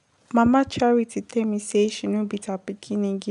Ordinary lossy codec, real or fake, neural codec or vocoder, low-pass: none; real; none; 10.8 kHz